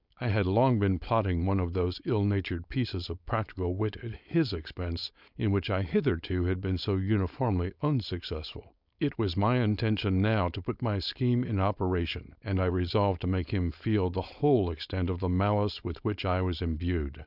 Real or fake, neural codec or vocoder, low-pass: fake; codec, 16 kHz, 4.8 kbps, FACodec; 5.4 kHz